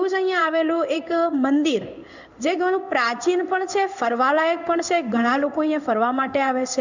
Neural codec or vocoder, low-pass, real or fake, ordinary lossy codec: codec, 16 kHz in and 24 kHz out, 1 kbps, XY-Tokenizer; 7.2 kHz; fake; none